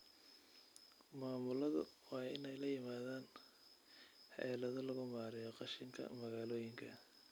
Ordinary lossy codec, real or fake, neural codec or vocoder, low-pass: none; real; none; none